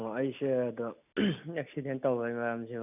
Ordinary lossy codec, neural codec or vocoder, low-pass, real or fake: none; none; 3.6 kHz; real